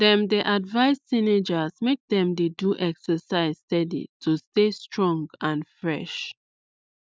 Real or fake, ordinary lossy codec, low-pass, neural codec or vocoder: real; none; none; none